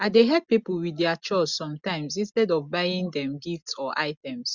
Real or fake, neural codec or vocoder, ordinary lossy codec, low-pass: real; none; Opus, 64 kbps; 7.2 kHz